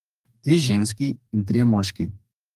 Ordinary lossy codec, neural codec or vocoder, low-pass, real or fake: Opus, 32 kbps; codec, 44.1 kHz, 2.6 kbps, DAC; 14.4 kHz; fake